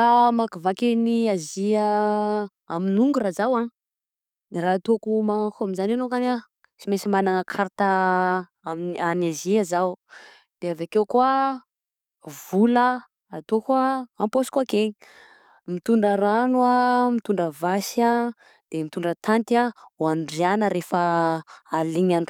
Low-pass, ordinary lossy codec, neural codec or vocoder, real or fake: 19.8 kHz; none; codec, 44.1 kHz, 7.8 kbps, DAC; fake